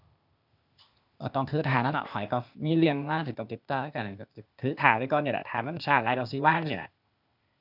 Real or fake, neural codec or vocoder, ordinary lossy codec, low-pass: fake; codec, 16 kHz, 0.8 kbps, ZipCodec; none; 5.4 kHz